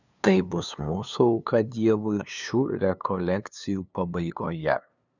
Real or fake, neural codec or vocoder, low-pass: fake; codec, 16 kHz, 2 kbps, FunCodec, trained on LibriTTS, 25 frames a second; 7.2 kHz